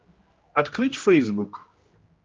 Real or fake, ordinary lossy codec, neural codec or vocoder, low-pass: fake; Opus, 24 kbps; codec, 16 kHz, 1 kbps, X-Codec, HuBERT features, trained on general audio; 7.2 kHz